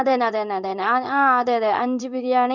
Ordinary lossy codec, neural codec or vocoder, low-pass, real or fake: none; codec, 16 kHz in and 24 kHz out, 1 kbps, XY-Tokenizer; 7.2 kHz; fake